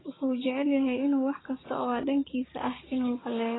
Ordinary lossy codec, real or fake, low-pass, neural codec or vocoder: AAC, 16 kbps; fake; 7.2 kHz; codec, 16 kHz, 6 kbps, DAC